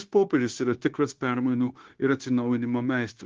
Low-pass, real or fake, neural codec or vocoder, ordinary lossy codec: 7.2 kHz; fake; codec, 16 kHz, 0.9 kbps, LongCat-Audio-Codec; Opus, 16 kbps